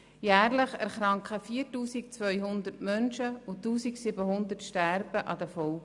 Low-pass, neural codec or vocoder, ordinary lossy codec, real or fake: 10.8 kHz; none; none; real